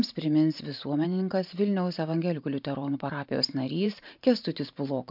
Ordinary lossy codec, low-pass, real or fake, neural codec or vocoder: MP3, 48 kbps; 5.4 kHz; fake; vocoder, 44.1 kHz, 128 mel bands every 512 samples, BigVGAN v2